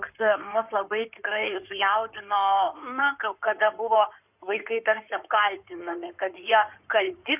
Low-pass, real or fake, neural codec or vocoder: 3.6 kHz; fake; codec, 16 kHz in and 24 kHz out, 2.2 kbps, FireRedTTS-2 codec